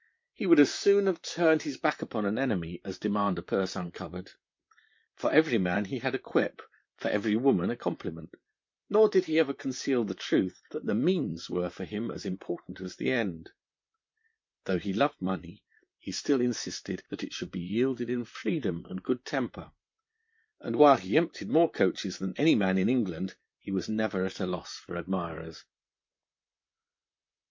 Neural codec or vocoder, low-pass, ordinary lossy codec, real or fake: vocoder, 44.1 kHz, 128 mel bands, Pupu-Vocoder; 7.2 kHz; MP3, 48 kbps; fake